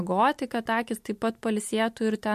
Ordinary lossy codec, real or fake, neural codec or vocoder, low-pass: MP3, 64 kbps; real; none; 14.4 kHz